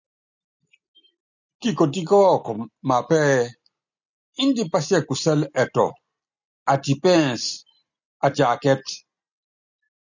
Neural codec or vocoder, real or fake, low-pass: none; real; 7.2 kHz